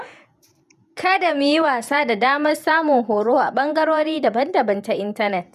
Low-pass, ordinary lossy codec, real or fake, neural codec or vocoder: 14.4 kHz; none; fake; vocoder, 48 kHz, 128 mel bands, Vocos